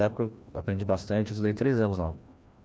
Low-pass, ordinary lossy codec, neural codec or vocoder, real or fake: none; none; codec, 16 kHz, 1 kbps, FreqCodec, larger model; fake